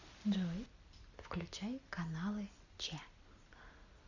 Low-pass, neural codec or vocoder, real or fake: 7.2 kHz; none; real